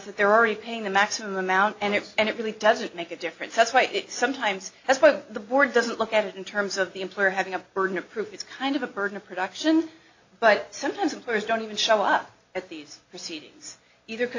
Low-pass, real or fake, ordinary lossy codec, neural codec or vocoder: 7.2 kHz; real; AAC, 48 kbps; none